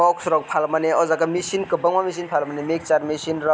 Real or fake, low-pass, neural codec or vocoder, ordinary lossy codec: real; none; none; none